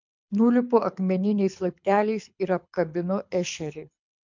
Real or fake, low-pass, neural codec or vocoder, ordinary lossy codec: fake; 7.2 kHz; codec, 24 kHz, 6 kbps, HILCodec; MP3, 64 kbps